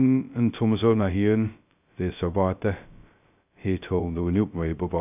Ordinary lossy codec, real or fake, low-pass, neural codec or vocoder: none; fake; 3.6 kHz; codec, 16 kHz, 0.2 kbps, FocalCodec